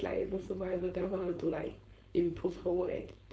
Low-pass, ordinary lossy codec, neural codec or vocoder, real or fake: none; none; codec, 16 kHz, 4.8 kbps, FACodec; fake